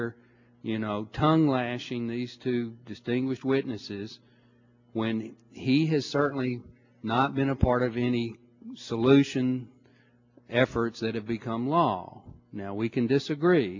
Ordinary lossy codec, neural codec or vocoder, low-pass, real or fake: MP3, 48 kbps; none; 7.2 kHz; real